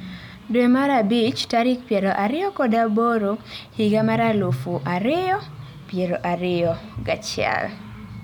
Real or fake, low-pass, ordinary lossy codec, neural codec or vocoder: real; 19.8 kHz; none; none